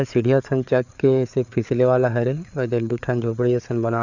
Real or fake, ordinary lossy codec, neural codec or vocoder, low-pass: fake; none; codec, 16 kHz, 4 kbps, FreqCodec, larger model; 7.2 kHz